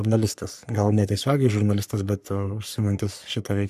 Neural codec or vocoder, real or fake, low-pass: codec, 44.1 kHz, 3.4 kbps, Pupu-Codec; fake; 14.4 kHz